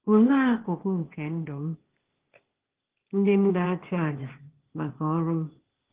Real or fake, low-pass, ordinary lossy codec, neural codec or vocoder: fake; 3.6 kHz; Opus, 16 kbps; codec, 16 kHz, 0.8 kbps, ZipCodec